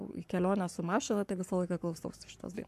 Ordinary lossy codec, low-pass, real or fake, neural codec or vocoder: AAC, 96 kbps; 14.4 kHz; fake; codec, 44.1 kHz, 7.8 kbps, Pupu-Codec